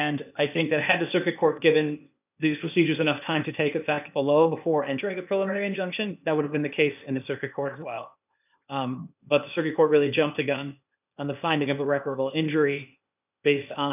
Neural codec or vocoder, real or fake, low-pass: codec, 16 kHz, 0.8 kbps, ZipCodec; fake; 3.6 kHz